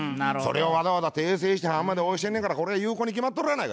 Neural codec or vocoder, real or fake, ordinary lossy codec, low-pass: none; real; none; none